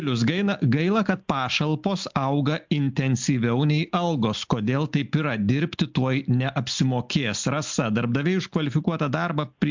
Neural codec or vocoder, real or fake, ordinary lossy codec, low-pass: none; real; MP3, 64 kbps; 7.2 kHz